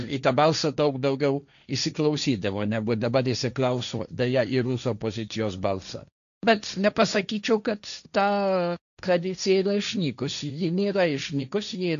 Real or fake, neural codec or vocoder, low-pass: fake; codec, 16 kHz, 1.1 kbps, Voila-Tokenizer; 7.2 kHz